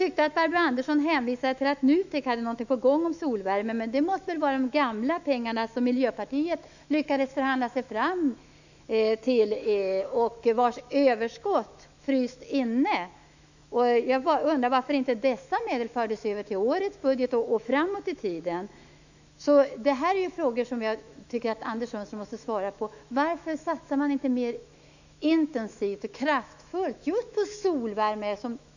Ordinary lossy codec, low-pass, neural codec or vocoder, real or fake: none; 7.2 kHz; autoencoder, 48 kHz, 128 numbers a frame, DAC-VAE, trained on Japanese speech; fake